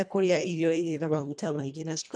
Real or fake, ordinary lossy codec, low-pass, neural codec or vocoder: fake; none; 9.9 kHz; codec, 24 kHz, 1.5 kbps, HILCodec